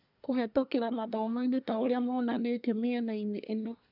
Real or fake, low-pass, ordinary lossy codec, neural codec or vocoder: fake; 5.4 kHz; none; codec, 24 kHz, 1 kbps, SNAC